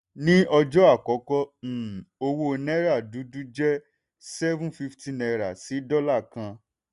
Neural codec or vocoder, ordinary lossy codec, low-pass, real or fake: none; none; 10.8 kHz; real